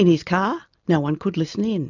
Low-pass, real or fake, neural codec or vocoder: 7.2 kHz; real; none